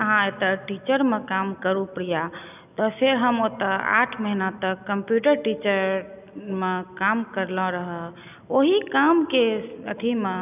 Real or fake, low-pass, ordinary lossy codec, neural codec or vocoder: real; 3.6 kHz; none; none